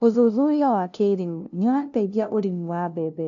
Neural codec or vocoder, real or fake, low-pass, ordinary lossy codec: codec, 16 kHz, 0.5 kbps, FunCodec, trained on LibriTTS, 25 frames a second; fake; 7.2 kHz; MP3, 96 kbps